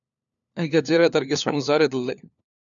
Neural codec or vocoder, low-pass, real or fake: codec, 16 kHz, 4 kbps, FunCodec, trained on LibriTTS, 50 frames a second; 7.2 kHz; fake